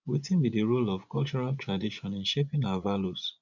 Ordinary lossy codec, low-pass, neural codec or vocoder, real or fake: none; 7.2 kHz; vocoder, 24 kHz, 100 mel bands, Vocos; fake